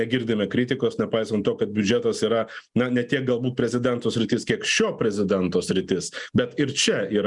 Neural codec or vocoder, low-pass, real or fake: none; 10.8 kHz; real